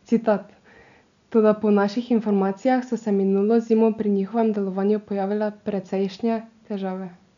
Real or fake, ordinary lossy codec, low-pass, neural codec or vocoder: real; none; 7.2 kHz; none